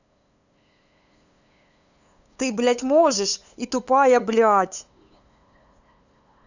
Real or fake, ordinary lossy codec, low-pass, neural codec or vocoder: fake; none; 7.2 kHz; codec, 16 kHz, 8 kbps, FunCodec, trained on LibriTTS, 25 frames a second